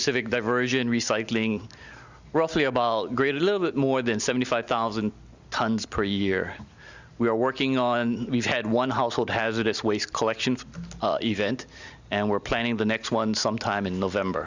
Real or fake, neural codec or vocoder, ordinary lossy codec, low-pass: real; none; Opus, 64 kbps; 7.2 kHz